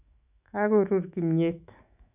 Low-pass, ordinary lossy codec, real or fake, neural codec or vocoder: 3.6 kHz; none; fake; autoencoder, 48 kHz, 128 numbers a frame, DAC-VAE, trained on Japanese speech